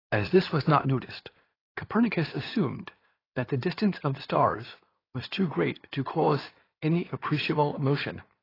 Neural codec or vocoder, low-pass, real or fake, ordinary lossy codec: codec, 16 kHz in and 24 kHz out, 2.2 kbps, FireRedTTS-2 codec; 5.4 kHz; fake; AAC, 24 kbps